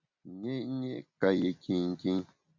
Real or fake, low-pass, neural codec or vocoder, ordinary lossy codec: real; 7.2 kHz; none; MP3, 48 kbps